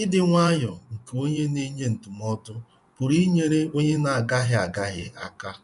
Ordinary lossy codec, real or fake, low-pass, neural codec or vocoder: none; fake; 10.8 kHz; vocoder, 24 kHz, 100 mel bands, Vocos